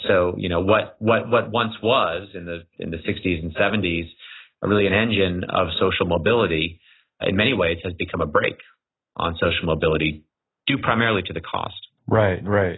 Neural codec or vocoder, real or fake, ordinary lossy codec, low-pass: none; real; AAC, 16 kbps; 7.2 kHz